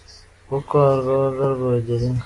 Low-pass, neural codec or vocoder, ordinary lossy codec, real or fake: 10.8 kHz; none; AAC, 32 kbps; real